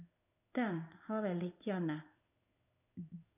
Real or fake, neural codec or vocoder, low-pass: fake; codec, 16 kHz in and 24 kHz out, 1 kbps, XY-Tokenizer; 3.6 kHz